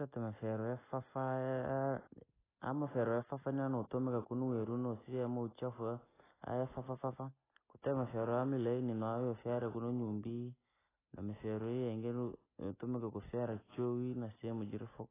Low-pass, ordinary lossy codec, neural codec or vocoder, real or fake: 3.6 kHz; AAC, 16 kbps; none; real